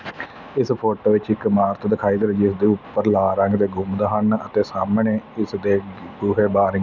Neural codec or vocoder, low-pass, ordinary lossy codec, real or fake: none; 7.2 kHz; none; real